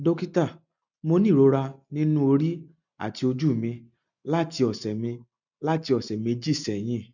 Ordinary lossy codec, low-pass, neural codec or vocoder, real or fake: none; 7.2 kHz; none; real